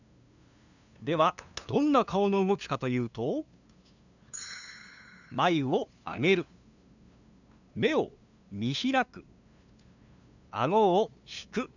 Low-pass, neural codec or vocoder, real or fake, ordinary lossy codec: 7.2 kHz; codec, 16 kHz, 2 kbps, FunCodec, trained on LibriTTS, 25 frames a second; fake; none